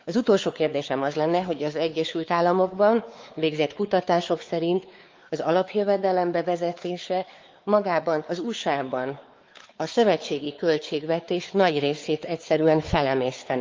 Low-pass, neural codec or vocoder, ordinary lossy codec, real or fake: 7.2 kHz; codec, 16 kHz, 4 kbps, X-Codec, WavLM features, trained on Multilingual LibriSpeech; Opus, 32 kbps; fake